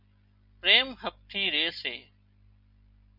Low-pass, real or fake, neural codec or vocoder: 5.4 kHz; real; none